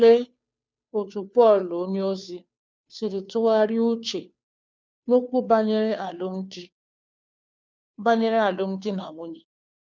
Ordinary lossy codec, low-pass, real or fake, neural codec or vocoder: none; none; fake; codec, 16 kHz, 2 kbps, FunCodec, trained on Chinese and English, 25 frames a second